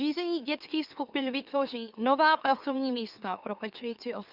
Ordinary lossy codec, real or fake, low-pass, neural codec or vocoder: Opus, 64 kbps; fake; 5.4 kHz; autoencoder, 44.1 kHz, a latent of 192 numbers a frame, MeloTTS